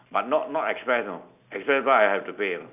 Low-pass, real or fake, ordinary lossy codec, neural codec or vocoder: 3.6 kHz; real; none; none